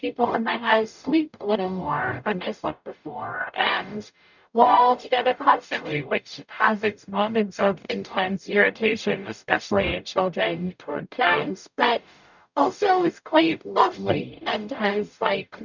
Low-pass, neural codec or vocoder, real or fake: 7.2 kHz; codec, 44.1 kHz, 0.9 kbps, DAC; fake